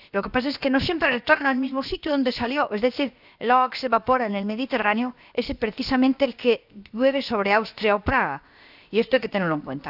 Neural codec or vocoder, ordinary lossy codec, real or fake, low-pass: codec, 16 kHz, about 1 kbps, DyCAST, with the encoder's durations; none; fake; 5.4 kHz